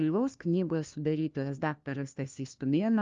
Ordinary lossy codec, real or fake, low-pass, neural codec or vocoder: Opus, 32 kbps; fake; 7.2 kHz; codec, 16 kHz, 1 kbps, FunCodec, trained on LibriTTS, 50 frames a second